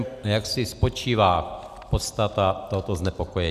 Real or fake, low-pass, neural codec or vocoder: real; 14.4 kHz; none